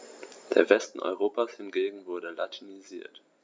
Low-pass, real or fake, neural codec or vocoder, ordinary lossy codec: none; real; none; none